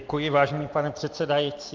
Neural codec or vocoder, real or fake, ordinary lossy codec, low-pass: none; real; Opus, 24 kbps; 7.2 kHz